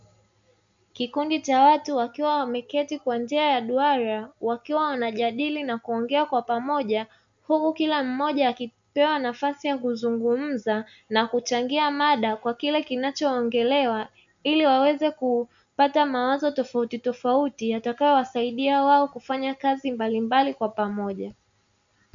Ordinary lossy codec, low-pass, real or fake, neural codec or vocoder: MP3, 64 kbps; 7.2 kHz; real; none